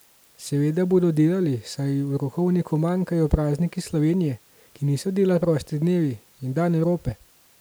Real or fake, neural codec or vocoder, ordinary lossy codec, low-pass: real; none; none; none